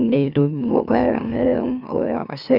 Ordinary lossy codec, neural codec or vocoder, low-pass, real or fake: none; autoencoder, 44.1 kHz, a latent of 192 numbers a frame, MeloTTS; 5.4 kHz; fake